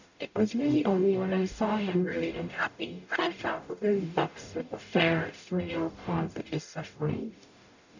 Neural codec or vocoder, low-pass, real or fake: codec, 44.1 kHz, 0.9 kbps, DAC; 7.2 kHz; fake